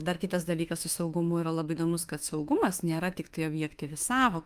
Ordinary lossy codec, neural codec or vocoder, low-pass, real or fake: Opus, 32 kbps; autoencoder, 48 kHz, 32 numbers a frame, DAC-VAE, trained on Japanese speech; 14.4 kHz; fake